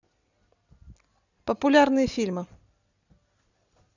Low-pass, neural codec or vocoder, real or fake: 7.2 kHz; none; real